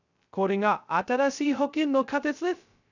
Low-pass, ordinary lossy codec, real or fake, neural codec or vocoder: 7.2 kHz; none; fake; codec, 16 kHz, 0.2 kbps, FocalCodec